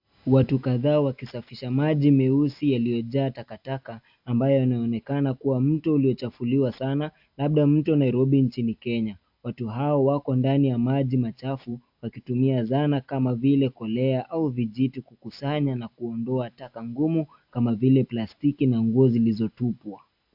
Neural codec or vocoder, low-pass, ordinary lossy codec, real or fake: none; 5.4 kHz; AAC, 48 kbps; real